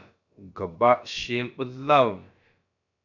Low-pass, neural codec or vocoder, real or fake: 7.2 kHz; codec, 16 kHz, about 1 kbps, DyCAST, with the encoder's durations; fake